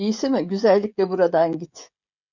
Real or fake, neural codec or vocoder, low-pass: fake; codec, 16 kHz, 16 kbps, FreqCodec, smaller model; 7.2 kHz